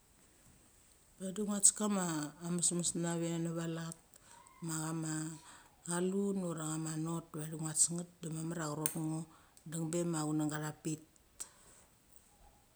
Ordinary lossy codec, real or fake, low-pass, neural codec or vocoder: none; real; none; none